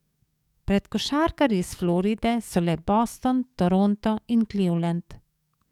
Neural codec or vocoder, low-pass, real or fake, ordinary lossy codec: codec, 44.1 kHz, 7.8 kbps, DAC; 19.8 kHz; fake; none